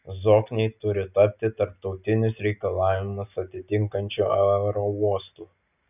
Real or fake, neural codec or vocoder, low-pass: real; none; 3.6 kHz